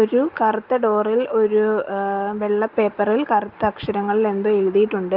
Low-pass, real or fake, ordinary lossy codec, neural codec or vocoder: 5.4 kHz; real; Opus, 32 kbps; none